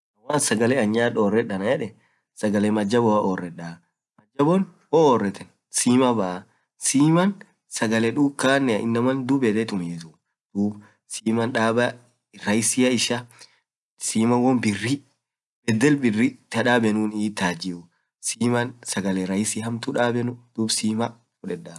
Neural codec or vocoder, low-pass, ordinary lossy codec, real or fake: none; none; none; real